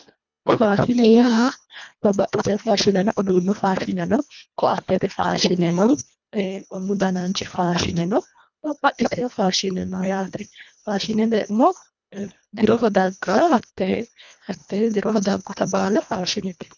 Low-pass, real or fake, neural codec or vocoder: 7.2 kHz; fake; codec, 24 kHz, 1.5 kbps, HILCodec